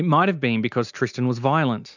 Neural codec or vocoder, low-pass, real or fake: none; 7.2 kHz; real